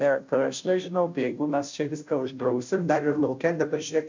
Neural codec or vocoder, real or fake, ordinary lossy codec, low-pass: codec, 16 kHz, 0.5 kbps, FunCodec, trained on Chinese and English, 25 frames a second; fake; MP3, 64 kbps; 7.2 kHz